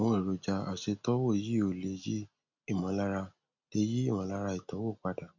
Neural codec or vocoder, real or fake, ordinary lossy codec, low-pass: none; real; none; 7.2 kHz